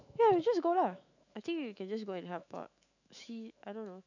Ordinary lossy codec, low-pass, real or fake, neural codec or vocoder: none; 7.2 kHz; fake; autoencoder, 48 kHz, 128 numbers a frame, DAC-VAE, trained on Japanese speech